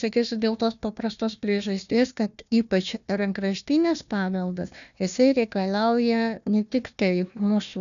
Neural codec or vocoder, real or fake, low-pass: codec, 16 kHz, 1 kbps, FunCodec, trained on Chinese and English, 50 frames a second; fake; 7.2 kHz